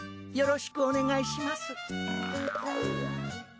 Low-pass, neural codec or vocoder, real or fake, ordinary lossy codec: none; none; real; none